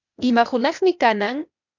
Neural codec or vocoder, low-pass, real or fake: codec, 16 kHz, 0.8 kbps, ZipCodec; 7.2 kHz; fake